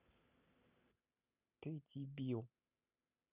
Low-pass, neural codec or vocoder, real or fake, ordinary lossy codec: 3.6 kHz; none; real; none